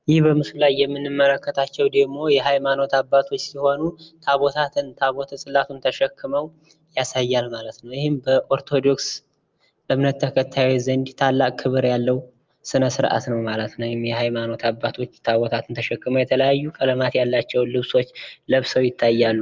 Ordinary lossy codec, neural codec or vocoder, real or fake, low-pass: Opus, 24 kbps; none; real; 7.2 kHz